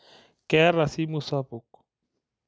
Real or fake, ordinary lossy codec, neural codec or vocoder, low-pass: real; none; none; none